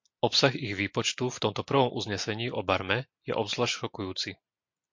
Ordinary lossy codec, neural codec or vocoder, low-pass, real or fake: MP3, 48 kbps; none; 7.2 kHz; real